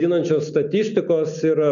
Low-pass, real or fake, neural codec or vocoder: 7.2 kHz; real; none